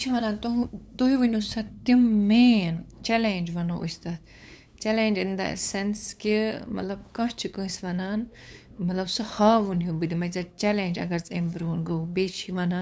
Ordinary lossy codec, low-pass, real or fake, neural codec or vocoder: none; none; fake; codec, 16 kHz, 8 kbps, FunCodec, trained on LibriTTS, 25 frames a second